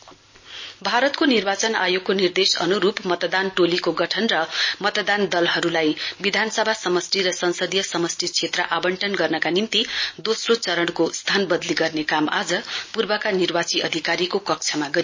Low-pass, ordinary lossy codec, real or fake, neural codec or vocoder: 7.2 kHz; MP3, 32 kbps; real; none